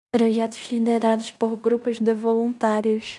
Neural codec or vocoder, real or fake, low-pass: codec, 16 kHz in and 24 kHz out, 0.9 kbps, LongCat-Audio-Codec, fine tuned four codebook decoder; fake; 10.8 kHz